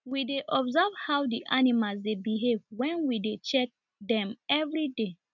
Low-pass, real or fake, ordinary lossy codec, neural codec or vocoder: 7.2 kHz; real; none; none